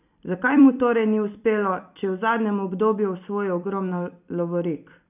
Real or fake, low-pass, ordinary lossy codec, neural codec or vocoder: fake; 3.6 kHz; none; vocoder, 44.1 kHz, 128 mel bands every 256 samples, BigVGAN v2